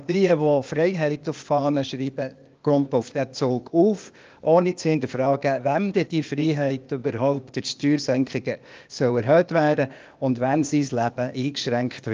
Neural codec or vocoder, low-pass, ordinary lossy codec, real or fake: codec, 16 kHz, 0.8 kbps, ZipCodec; 7.2 kHz; Opus, 24 kbps; fake